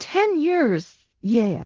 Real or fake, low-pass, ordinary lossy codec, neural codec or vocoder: fake; 7.2 kHz; Opus, 16 kbps; codec, 16 kHz in and 24 kHz out, 0.4 kbps, LongCat-Audio-Codec, fine tuned four codebook decoder